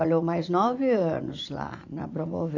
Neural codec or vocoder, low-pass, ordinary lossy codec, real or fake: vocoder, 44.1 kHz, 80 mel bands, Vocos; 7.2 kHz; AAC, 48 kbps; fake